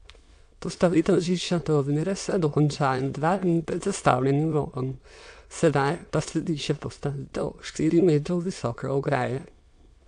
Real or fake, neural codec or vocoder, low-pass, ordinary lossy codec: fake; autoencoder, 22.05 kHz, a latent of 192 numbers a frame, VITS, trained on many speakers; 9.9 kHz; AAC, 64 kbps